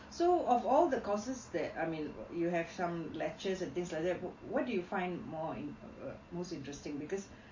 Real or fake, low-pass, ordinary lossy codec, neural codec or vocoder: real; 7.2 kHz; MP3, 32 kbps; none